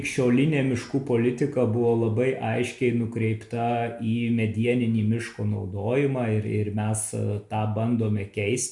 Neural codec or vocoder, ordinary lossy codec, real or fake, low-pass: none; MP3, 96 kbps; real; 10.8 kHz